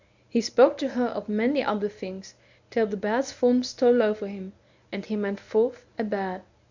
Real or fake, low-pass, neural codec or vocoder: fake; 7.2 kHz; codec, 24 kHz, 0.9 kbps, WavTokenizer, medium speech release version 1